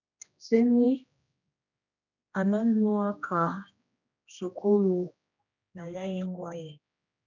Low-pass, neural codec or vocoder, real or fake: 7.2 kHz; codec, 16 kHz, 1 kbps, X-Codec, HuBERT features, trained on general audio; fake